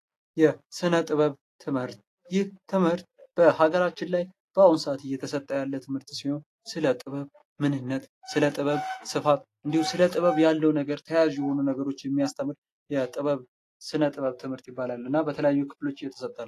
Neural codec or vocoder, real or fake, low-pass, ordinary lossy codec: none; real; 14.4 kHz; AAC, 48 kbps